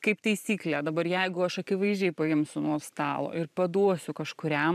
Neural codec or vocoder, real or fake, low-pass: vocoder, 44.1 kHz, 128 mel bands every 512 samples, BigVGAN v2; fake; 14.4 kHz